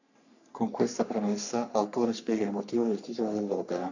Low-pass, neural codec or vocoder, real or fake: 7.2 kHz; codec, 44.1 kHz, 3.4 kbps, Pupu-Codec; fake